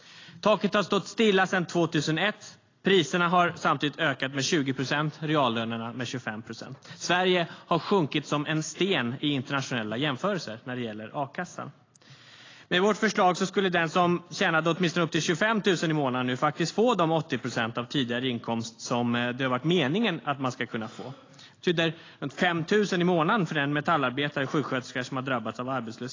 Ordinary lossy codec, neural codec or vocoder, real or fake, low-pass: AAC, 32 kbps; none; real; 7.2 kHz